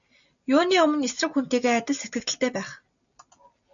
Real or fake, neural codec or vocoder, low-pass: real; none; 7.2 kHz